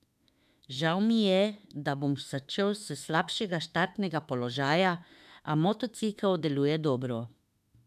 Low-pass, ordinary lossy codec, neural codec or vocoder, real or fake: 14.4 kHz; none; autoencoder, 48 kHz, 32 numbers a frame, DAC-VAE, trained on Japanese speech; fake